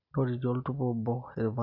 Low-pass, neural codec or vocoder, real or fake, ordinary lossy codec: 5.4 kHz; none; real; none